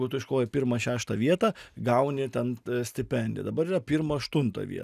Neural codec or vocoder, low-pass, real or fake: none; 14.4 kHz; real